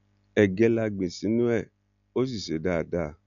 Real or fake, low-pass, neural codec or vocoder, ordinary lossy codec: real; 7.2 kHz; none; none